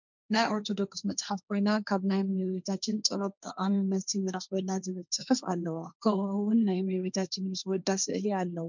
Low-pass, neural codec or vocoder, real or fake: 7.2 kHz; codec, 16 kHz, 1.1 kbps, Voila-Tokenizer; fake